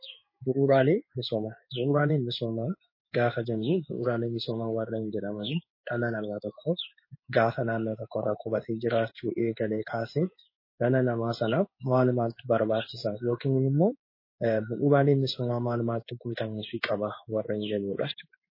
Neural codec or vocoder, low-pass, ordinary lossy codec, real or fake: codec, 16 kHz in and 24 kHz out, 1 kbps, XY-Tokenizer; 5.4 kHz; MP3, 24 kbps; fake